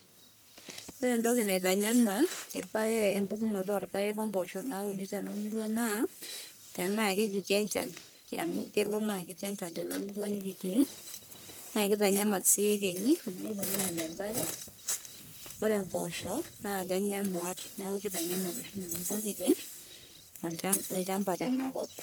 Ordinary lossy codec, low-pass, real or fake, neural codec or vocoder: none; none; fake; codec, 44.1 kHz, 1.7 kbps, Pupu-Codec